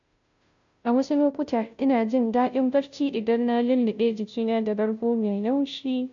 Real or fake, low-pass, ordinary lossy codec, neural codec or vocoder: fake; 7.2 kHz; MP3, 64 kbps; codec, 16 kHz, 0.5 kbps, FunCodec, trained on Chinese and English, 25 frames a second